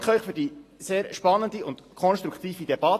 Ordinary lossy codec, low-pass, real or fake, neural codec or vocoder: AAC, 48 kbps; 14.4 kHz; real; none